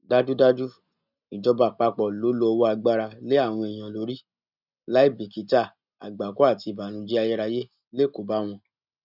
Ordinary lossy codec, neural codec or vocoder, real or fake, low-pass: none; none; real; 5.4 kHz